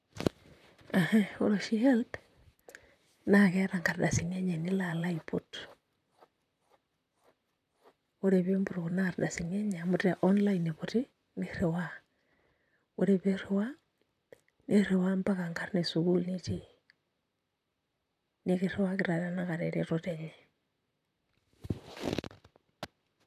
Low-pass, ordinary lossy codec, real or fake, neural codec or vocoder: 14.4 kHz; none; fake; vocoder, 44.1 kHz, 128 mel bands, Pupu-Vocoder